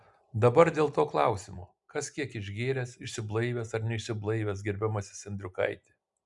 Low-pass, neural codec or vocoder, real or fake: 10.8 kHz; none; real